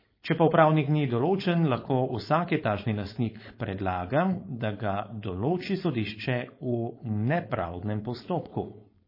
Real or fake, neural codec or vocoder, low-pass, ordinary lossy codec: fake; codec, 16 kHz, 4.8 kbps, FACodec; 5.4 kHz; MP3, 24 kbps